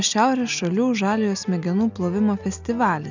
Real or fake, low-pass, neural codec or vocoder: real; 7.2 kHz; none